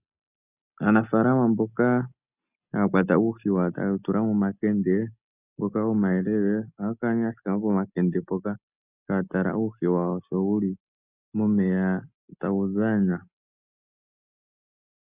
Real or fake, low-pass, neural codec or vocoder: real; 3.6 kHz; none